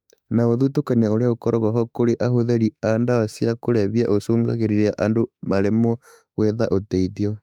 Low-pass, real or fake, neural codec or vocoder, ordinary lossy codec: 19.8 kHz; fake; autoencoder, 48 kHz, 32 numbers a frame, DAC-VAE, trained on Japanese speech; none